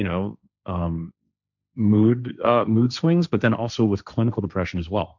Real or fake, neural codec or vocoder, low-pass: fake; codec, 16 kHz, 1.1 kbps, Voila-Tokenizer; 7.2 kHz